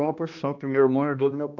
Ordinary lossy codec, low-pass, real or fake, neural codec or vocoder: none; 7.2 kHz; fake; codec, 16 kHz, 1 kbps, X-Codec, HuBERT features, trained on balanced general audio